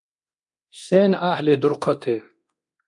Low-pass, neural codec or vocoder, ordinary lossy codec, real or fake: 10.8 kHz; codec, 24 kHz, 0.9 kbps, DualCodec; MP3, 96 kbps; fake